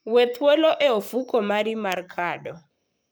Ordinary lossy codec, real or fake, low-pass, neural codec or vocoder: none; fake; none; codec, 44.1 kHz, 7.8 kbps, Pupu-Codec